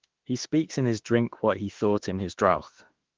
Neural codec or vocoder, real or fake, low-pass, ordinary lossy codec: autoencoder, 48 kHz, 32 numbers a frame, DAC-VAE, trained on Japanese speech; fake; 7.2 kHz; Opus, 16 kbps